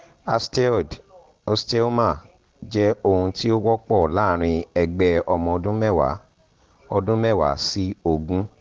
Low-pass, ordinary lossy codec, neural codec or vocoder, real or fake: 7.2 kHz; Opus, 16 kbps; none; real